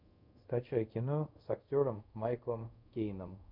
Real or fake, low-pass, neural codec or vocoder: fake; 5.4 kHz; codec, 24 kHz, 0.5 kbps, DualCodec